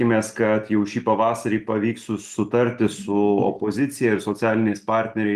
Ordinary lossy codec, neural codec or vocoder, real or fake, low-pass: Opus, 24 kbps; none; real; 14.4 kHz